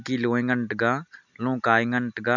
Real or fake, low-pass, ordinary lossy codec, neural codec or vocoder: real; 7.2 kHz; none; none